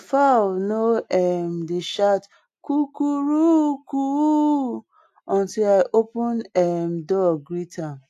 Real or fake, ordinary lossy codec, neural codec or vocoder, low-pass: real; AAC, 64 kbps; none; 14.4 kHz